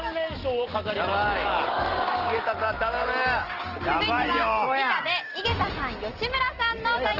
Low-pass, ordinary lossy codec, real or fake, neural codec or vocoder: 5.4 kHz; Opus, 16 kbps; real; none